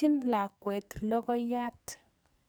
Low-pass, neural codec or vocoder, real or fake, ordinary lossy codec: none; codec, 44.1 kHz, 2.6 kbps, SNAC; fake; none